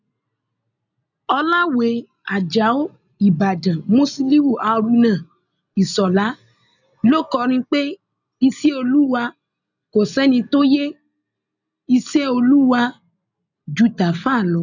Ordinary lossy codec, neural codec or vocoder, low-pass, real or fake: none; none; 7.2 kHz; real